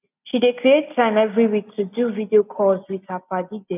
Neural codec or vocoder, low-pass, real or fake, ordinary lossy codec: none; 3.6 kHz; real; none